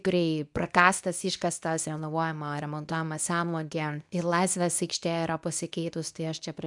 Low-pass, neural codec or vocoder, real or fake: 10.8 kHz; codec, 24 kHz, 0.9 kbps, WavTokenizer, medium speech release version 2; fake